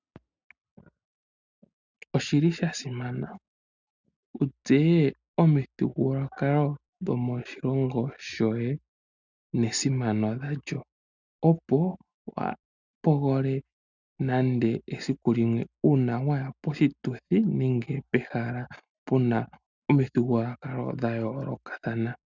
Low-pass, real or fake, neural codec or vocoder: 7.2 kHz; real; none